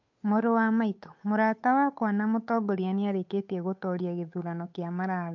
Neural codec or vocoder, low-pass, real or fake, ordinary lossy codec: codec, 16 kHz, 8 kbps, FunCodec, trained on Chinese and English, 25 frames a second; 7.2 kHz; fake; MP3, 48 kbps